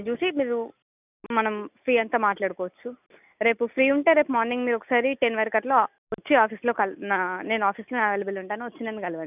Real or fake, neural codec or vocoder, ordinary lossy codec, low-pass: real; none; none; 3.6 kHz